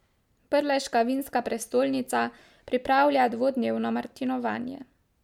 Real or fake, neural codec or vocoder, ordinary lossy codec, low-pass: real; none; MP3, 96 kbps; 19.8 kHz